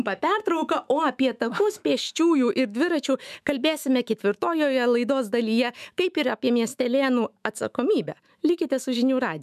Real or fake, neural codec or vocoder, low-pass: fake; autoencoder, 48 kHz, 128 numbers a frame, DAC-VAE, trained on Japanese speech; 14.4 kHz